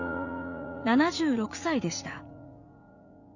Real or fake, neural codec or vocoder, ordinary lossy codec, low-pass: fake; vocoder, 44.1 kHz, 80 mel bands, Vocos; none; 7.2 kHz